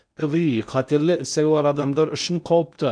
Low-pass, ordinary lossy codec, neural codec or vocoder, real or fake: 9.9 kHz; none; codec, 16 kHz in and 24 kHz out, 0.8 kbps, FocalCodec, streaming, 65536 codes; fake